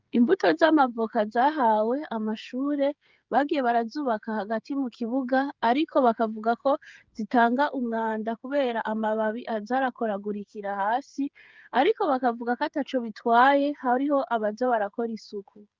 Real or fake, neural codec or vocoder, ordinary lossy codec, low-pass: fake; codec, 16 kHz, 8 kbps, FreqCodec, smaller model; Opus, 32 kbps; 7.2 kHz